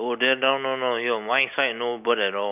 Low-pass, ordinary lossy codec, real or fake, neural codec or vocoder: 3.6 kHz; none; real; none